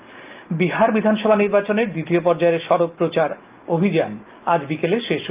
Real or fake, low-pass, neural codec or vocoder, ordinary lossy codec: real; 3.6 kHz; none; Opus, 32 kbps